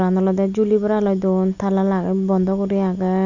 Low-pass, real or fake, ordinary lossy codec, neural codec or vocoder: 7.2 kHz; real; none; none